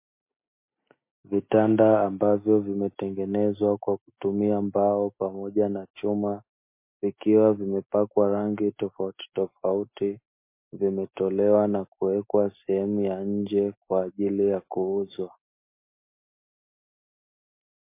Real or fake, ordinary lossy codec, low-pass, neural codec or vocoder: real; MP3, 24 kbps; 3.6 kHz; none